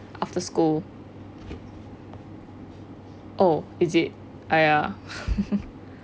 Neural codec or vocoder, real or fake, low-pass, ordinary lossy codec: none; real; none; none